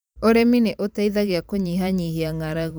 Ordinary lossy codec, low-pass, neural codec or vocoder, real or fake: none; none; vocoder, 44.1 kHz, 128 mel bands every 512 samples, BigVGAN v2; fake